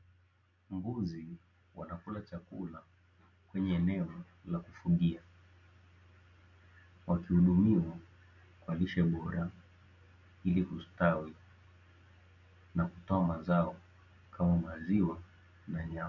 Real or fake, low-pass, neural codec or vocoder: real; 7.2 kHz; none